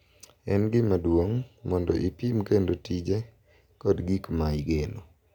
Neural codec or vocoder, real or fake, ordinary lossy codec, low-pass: none; real; none; 19.8 kHz